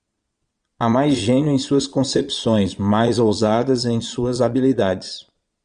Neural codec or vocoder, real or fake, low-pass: vocoder, 22.05 kHz, 80 mel bands, Vocos; fake; 9.9 kHz